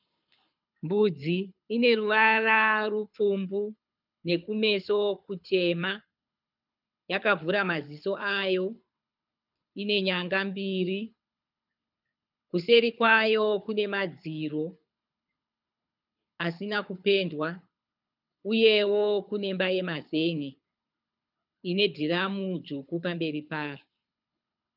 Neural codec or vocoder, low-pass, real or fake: codec, 24 kHz, 6 kbps, HILCodec; 5.4 kHz; fake